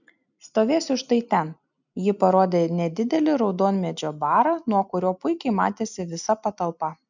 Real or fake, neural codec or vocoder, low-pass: real; none; 7.2 kHz